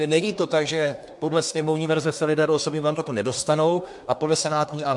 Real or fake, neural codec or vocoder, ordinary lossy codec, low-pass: fake; codec, 24 kHz, 1 kbps, SNAC; MP3, 64 kbps; 10.8 kHz